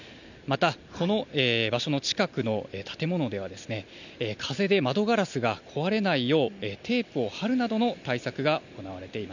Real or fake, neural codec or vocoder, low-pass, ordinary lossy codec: real; none; 7.2 kHz; none